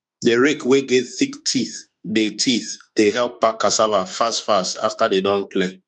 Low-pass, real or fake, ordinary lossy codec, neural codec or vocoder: 10.8 kHz; fake; none; autoencoder, 48 kHz, 32 numbers a frame, DAC-VAE, trained on Japanese speech